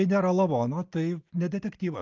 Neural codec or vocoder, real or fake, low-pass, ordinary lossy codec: none; real; 7.2 kHz; Opus, 24 kbps